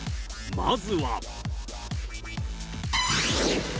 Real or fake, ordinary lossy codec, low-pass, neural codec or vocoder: real; none; none; none